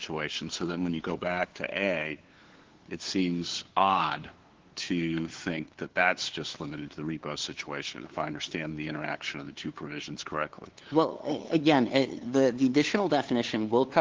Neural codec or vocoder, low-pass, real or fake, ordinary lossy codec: codec, 16 kHz, 2 kbps, FunCodec, trained on LibriTTS, 25 frames a second; 7.2 kHz; fake; Opus, 16 kbps